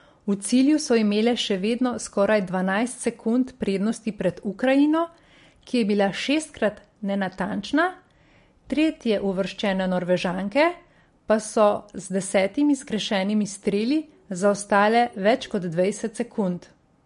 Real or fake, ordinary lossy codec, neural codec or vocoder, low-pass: real; MP3, 48 kbps; none; 10.8 kHz